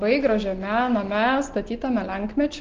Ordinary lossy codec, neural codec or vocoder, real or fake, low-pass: Opus, 16 kbps; none; real; 7.2 kHz